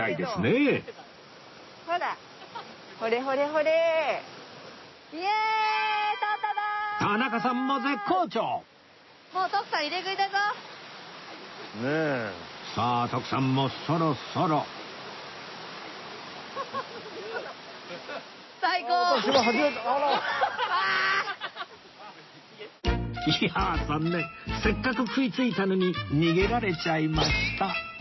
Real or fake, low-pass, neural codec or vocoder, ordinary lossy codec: real; 7.2 kHz; none; MP3, 24 kbps